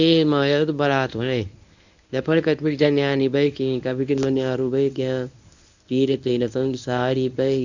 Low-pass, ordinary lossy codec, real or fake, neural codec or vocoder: 7.2 kHz; none; fake; codec, 24 kHz, 0.9 kbps, WavTokenizer, medium speech release version 1